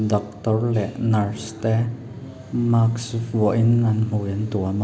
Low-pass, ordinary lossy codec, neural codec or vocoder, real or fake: none; none; none; real